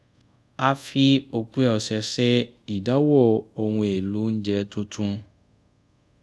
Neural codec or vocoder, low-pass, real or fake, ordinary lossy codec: codec, 24 kHz, 0.5 kbps, DualCodec; none; fake; none